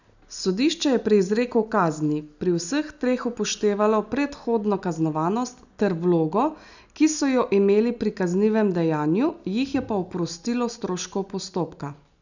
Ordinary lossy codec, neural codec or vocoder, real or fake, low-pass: none; none; real; 7.2 kHz